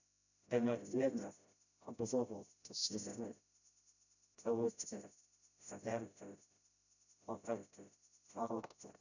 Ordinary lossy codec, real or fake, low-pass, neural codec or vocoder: none; fake; 7.2 kHz; codec, 16 kHz, 0.5 kbps, FreqCodec, smaller model